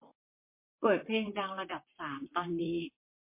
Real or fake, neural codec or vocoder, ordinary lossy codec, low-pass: fake; vocoder, 44.1 kHz, 128 mel bands, Pupu-Vocoder; MP3, 24 kbps; 3.6 kHz